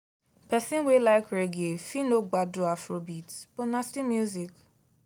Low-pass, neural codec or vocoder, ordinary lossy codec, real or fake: none; none; none; real